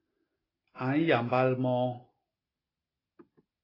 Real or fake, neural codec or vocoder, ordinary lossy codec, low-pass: real; none; AAC, 24 kbps; 5.4 kHz